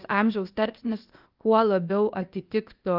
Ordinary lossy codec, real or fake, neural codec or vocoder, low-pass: Opus, 24 kbps; fake; codec, 16 kHz, 0.8 kbps, ZipCodec; 5.4 kHz